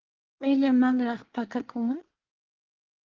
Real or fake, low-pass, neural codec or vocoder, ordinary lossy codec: fake; 7.2 kHz; codec, 24 kHz, 1 kbps, SNAC; Opus, 16 kbps